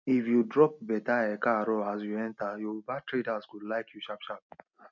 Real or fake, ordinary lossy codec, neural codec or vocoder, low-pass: real; none; none; 7.2 kHz